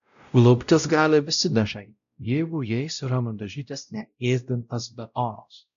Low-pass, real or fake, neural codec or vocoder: 7.2 kHz; fake; codec, 16 kHz, 0.5 kbps, X-Codec, WavLM features, trained on Multilingual LibriSpeech